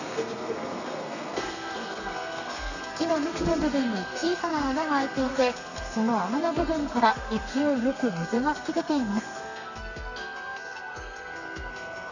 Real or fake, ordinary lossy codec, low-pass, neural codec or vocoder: fake; none; 7.2 kHz; codec, 32 kHz, 1.9 kbps, SNAC